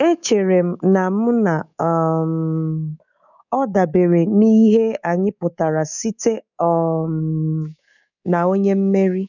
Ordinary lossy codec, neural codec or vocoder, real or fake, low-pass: none; codec, 24 kHz, 3.1 kbps, DualCodec; fake; 7.2 kHz